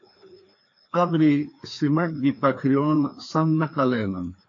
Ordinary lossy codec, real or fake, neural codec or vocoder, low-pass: AAC, 48 kbps; fake; codec, 16 kHz, 2 kbps, FreqCodec, larger model; 7.2 kHz